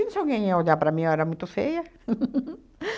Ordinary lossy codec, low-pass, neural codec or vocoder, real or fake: none; none; none; real